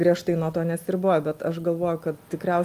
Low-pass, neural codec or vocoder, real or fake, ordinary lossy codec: 14.4 kHz; none; real; Opus, 32 kbps